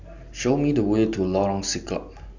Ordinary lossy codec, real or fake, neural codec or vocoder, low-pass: none; real; none; 7.2 kHz